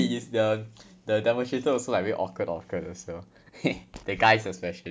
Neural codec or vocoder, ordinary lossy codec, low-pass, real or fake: none; none; none; real